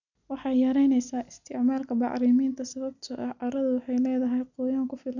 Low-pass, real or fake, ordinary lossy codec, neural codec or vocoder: 7.2 kHz; real; none; none